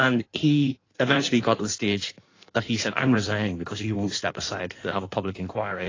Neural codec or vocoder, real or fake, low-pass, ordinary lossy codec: codec, 16 kHz in and 24 kHz out, 1.1 kbps, FireRedTTS-2 codec; fake; 7.2 kHz; AAC, 32 kbps